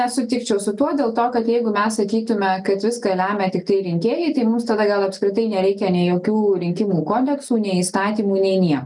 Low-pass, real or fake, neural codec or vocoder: 10.8 kHz; real; none